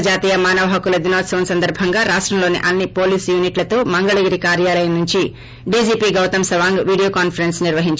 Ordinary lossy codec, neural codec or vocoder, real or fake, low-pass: none; none; real; none